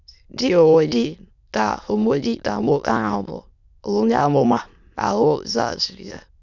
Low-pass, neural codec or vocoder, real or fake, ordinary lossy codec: 7.2 kHz; autoencoder, 22.05 kHz, a latent of 192 numbers a frame, VITS, trained on many speakers; fake; none